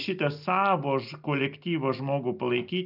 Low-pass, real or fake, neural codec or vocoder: 5.4 kHz; real; none